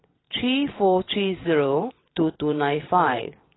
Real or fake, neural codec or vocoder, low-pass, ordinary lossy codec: fake; vocoder, 22.05 kHz, 80 mel bands, WaveNeXt; 7.2 kHz; AAC, 16 kbps